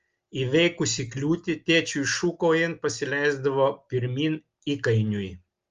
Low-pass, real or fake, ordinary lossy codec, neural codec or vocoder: 7.2 kHz; real; Opus, 32 kbps; none